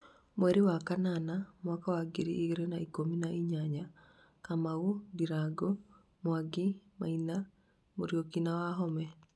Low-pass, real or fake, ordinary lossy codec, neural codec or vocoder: none; real; none; none